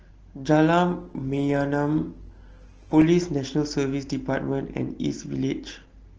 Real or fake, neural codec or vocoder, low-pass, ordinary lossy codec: real; none; 7.2 kHz; Opus, 16 kbps